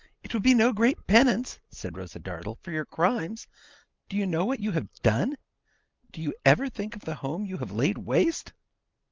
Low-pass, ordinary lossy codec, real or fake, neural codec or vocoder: 7.2 kHz; Opus, 32 kbps; real; none